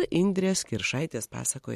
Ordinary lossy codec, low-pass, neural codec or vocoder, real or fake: MP3, 64 kbps; 14.4 kHz; none; real